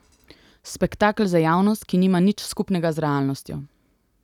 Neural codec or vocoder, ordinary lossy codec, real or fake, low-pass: none; none; real; 19.8 kHz